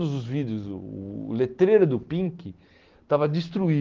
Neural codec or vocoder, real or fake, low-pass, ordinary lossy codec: none; real; 7.2 kHz; Opus, 16 kbps